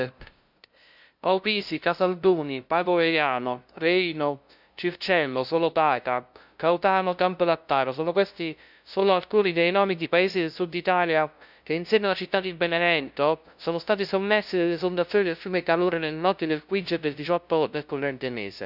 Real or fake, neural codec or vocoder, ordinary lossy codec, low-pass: fake; codec, 16 kHz, 0.5 kbps, FunCodec, trained on LibriTTS, 25 frames a second; none; 5.4 kHz